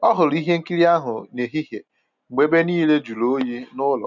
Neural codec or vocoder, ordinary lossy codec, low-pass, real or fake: none; none; 7.2 kHz; real